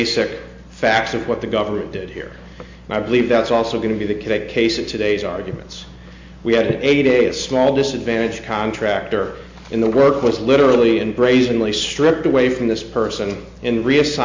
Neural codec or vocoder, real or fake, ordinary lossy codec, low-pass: none; real; MP3, 64 kbps; 7.2 kHz